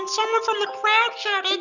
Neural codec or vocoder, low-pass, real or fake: none; 7.2 kHz; real